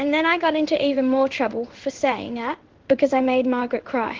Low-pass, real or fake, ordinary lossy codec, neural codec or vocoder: 7.2 kHz; fake; Opus, 16 kbps; codec, 16 kHz in and 24 kHz out, 1 kbps, XY-Tokenizer